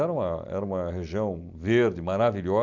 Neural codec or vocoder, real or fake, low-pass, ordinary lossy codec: none; real; 7.2 kHz; none